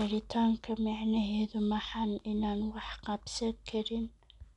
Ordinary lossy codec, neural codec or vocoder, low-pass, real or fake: none; none; 10.8 kHz; real